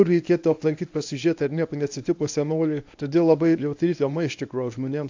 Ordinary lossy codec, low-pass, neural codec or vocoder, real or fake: AAC, 48 kbps; 7.2 kHz; codec, 24 kHz, 0.9 kbps, WavTokenizer, medium speech release version 1; fake